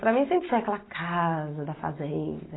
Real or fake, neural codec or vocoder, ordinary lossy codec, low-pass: real; none; AAC, 16 kbps; 7.2 kHz